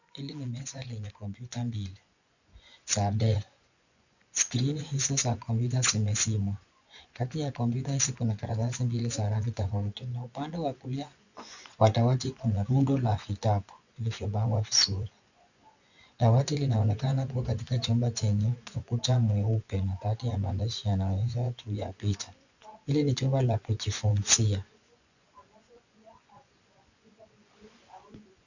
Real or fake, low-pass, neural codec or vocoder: fake; 7.2 kHz; vocoder, 24 kHz, 100 mel bands, Vocos